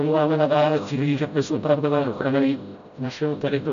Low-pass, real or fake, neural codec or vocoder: 7.2 kHz; fake; codec, 16 kHz, 0.5 kbps, FreqCodec, smaller model